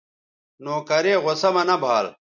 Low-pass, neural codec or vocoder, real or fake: 7.2 kHz; none; real